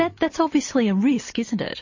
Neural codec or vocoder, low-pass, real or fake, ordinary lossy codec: none; 7.2 kHz; real; MP3, 32 kbps